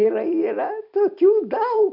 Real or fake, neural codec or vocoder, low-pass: fake; vocoder, 44.1 kHz, 128 mel bands every 256 samples, BigVGAN v2; 5.4 kHz